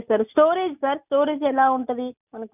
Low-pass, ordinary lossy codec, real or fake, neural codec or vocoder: 3.6 kHz; none; real; none